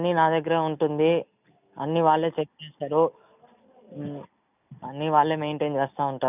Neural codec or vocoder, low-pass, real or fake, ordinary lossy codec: none; 3.6 kHz; real; none